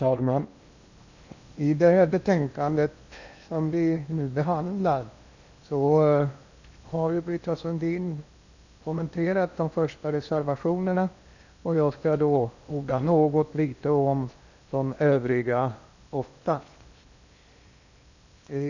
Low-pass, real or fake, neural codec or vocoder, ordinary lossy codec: 7.2 kHz; fake; codec, 16 kHz in and 24 kHz out, 0.8 kbps, FocalCodec, streaming, 65536 codes; none